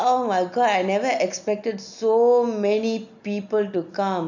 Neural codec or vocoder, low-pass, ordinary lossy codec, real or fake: none; 7.2 kHz; none; real